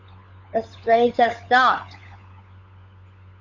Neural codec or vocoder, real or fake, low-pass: codec, 16 kHz, 16 kbps, FunCodec, trained on LibriTTS, 50 frames a second; fake; 7.2 kHz